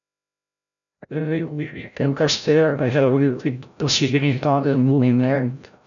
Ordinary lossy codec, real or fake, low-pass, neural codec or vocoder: none; fake; 7.2 kHz; codec, 16 kHz, 0.5 kbps, FreqCodec, larger model